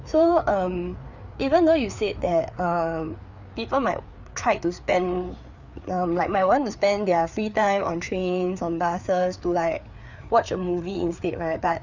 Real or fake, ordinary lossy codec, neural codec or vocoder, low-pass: fake; none; codec, 16 kHz, 4 kbps, FreqCodec, larger model; 7.2 kHz